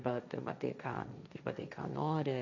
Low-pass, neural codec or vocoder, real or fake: 7.2 kHz; codec, 16 kHz, 1.1 kbps, Voila-Tokenizer; fake